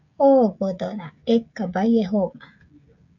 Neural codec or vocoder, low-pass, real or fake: codec, 16 kHz, 16 kbps, FreqCodec, smaller model; 7.2 kHz; fake